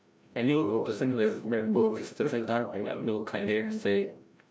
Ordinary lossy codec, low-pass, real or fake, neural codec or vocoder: none; none; fake; codec, 16 kHz, 0.5 kbps, FreqCodec, larger model